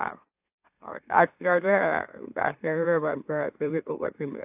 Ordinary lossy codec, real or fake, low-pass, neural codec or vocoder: AAC, 32 kbps; fake; 3.6 kHz; autoencoder, 44.1 kHz, a latent of 192 numbers a frame, MeloTTS